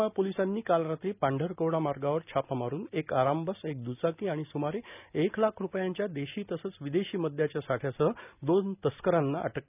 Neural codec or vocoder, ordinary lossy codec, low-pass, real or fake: none; none; 3.6 kHz; real